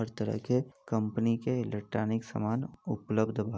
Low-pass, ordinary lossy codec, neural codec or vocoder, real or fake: none; none; none; real